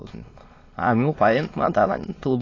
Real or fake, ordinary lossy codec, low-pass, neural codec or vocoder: fake; AAC, 32 kbps; 7.2 kHz; autoencoder, 22.05 kHz, a latent of 192 numbers a frame, VITS, trained on many speakers